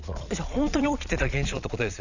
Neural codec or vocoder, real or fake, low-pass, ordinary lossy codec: vocoder, 22.05 kHz, 80 mel bands, Vocos; fake; 7.2 kHz; none